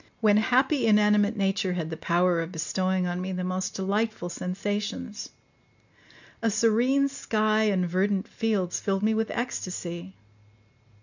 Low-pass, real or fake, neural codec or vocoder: 7.2 kHz; real; none